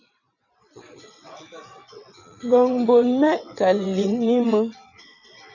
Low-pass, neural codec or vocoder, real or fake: 7.2 kHz; vocoder, 22.05 kHz, 80 mel bands, WaveNeXt; fake